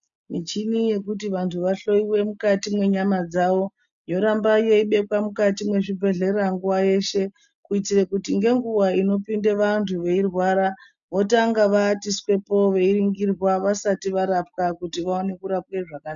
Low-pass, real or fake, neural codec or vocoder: 7.2 kHz; real; none